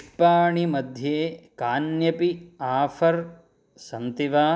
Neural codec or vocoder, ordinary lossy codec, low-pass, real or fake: none; none; none; real